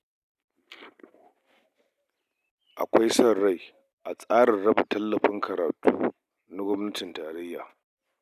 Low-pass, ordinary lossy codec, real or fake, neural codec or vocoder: 14.4 kHz; none; real; none